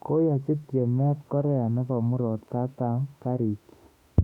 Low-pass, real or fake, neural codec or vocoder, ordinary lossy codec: 19.8 kHz; fake; autoencoder, 48 kHz, 32 numbers a frame, DAC-VAE, trained on Japanese speech; none